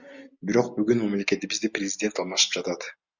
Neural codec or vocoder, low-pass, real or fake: none; 7.2 kHz; real